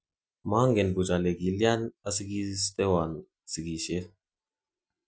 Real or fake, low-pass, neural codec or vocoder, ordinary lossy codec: real; none; none; none